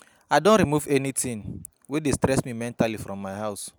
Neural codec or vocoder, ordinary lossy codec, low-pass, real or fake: none; none; none; real